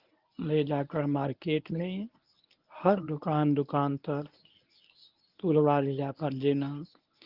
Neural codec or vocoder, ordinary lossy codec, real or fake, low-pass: codec, 24 kHz, 0.9 kbps, WavTokenizer, medium speech release version 1; Opus, 24 kbps; fake; 5.4 kHz